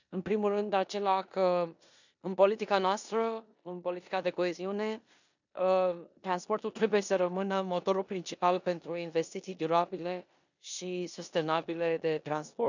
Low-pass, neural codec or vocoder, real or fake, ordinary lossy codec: 7.2 kHz; codec, 16 kHz in and 24 kHz out, 0.9 kbps, LongCat-Audio-Codec, four codebook decoder; fake; none